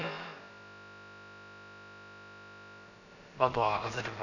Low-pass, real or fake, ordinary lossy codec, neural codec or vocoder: 7.2 kHz; fake; none; codec, 16 kHz, about 1 kbps, DyCAST, with the encoder's durations